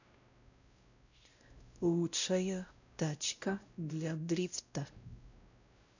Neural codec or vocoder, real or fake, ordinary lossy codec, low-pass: codec, 16 kHz, 0.5 kbps, X-Codec, WavLM features, trained on Multilingual LibriSpeech; fake; none; 7.2 kHz